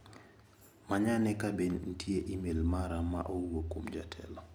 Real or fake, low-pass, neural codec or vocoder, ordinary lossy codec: real; none; none; none